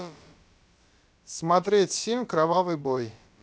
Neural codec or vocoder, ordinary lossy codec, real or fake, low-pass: codec, 16 kHz, about 1 kbps, DyCAST, with the encoder's durations; none; fake; none